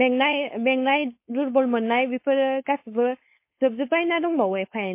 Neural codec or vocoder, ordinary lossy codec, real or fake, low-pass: codec, 16 kHz, 4.8 kbps, FACodec; MP3, 24 kbps; fake; 3.6 kHz